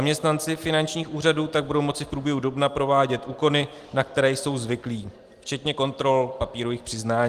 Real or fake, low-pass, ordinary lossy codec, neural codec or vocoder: real; 14.4 kHz; Opus, 24 kbps; none